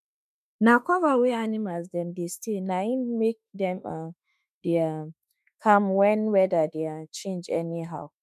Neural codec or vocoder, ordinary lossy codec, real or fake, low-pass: autoencoder, 48 kHz, 32 numbers a frame, DAC-VAE, trained on Japanese speech; MP3, 96 kbps; fake; 14.4 kHz